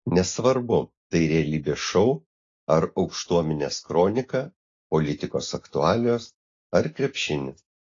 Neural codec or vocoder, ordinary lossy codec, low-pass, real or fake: none; AAC, 32 kbps; 7.2 kHz; real